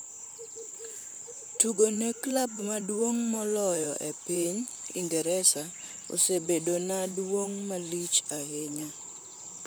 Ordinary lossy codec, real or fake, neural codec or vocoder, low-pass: none; fake; vocoder, 44.1 kHz, 128 mel bands, Pupu-Vocoder; none